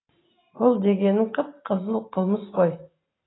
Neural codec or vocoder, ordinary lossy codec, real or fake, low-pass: none; AAC, 16 kbps; real; 7.2 kHz